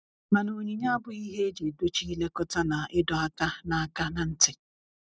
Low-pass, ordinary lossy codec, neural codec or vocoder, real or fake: none; none; none; real